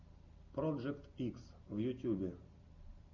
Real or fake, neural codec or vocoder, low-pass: real; none; 7.2 kHz